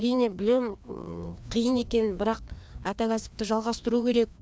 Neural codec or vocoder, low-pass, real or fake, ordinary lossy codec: codec, 16 kHz, 2 kbps, FreqCodec, larger model; none; fake; none